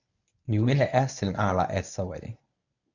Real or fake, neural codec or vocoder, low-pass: fake; codec, 24 kHz, 0.9 kbps, WavTokenizer, medium speech release version 1; 7.2 kHz